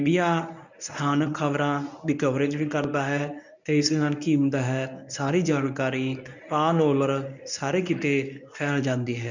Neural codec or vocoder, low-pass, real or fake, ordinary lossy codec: codec, 24 kHz, 0.9 kbps, WavTokenizer, medium speech release version 1; 7.2 kHz; fake; none